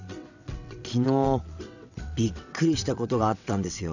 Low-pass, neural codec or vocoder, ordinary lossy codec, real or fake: 7.2 kHz; vocoder, 22.05 kHz, 80 mel bands, WaveNeXt; none; fake